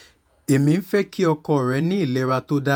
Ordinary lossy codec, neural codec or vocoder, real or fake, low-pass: none; vocoder, 48 kHz, 128 mel bands, Vocos; fake; none